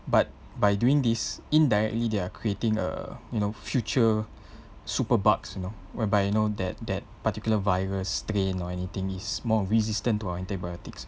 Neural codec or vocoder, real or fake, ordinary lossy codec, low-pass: none; real; none; none